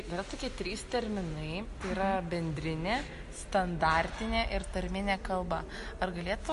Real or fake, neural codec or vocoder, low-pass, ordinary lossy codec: real; none; 14.4 kHz; MP3, 48 kbps